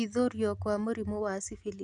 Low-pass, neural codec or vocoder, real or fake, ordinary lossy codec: 10.8 kHz; none; real; none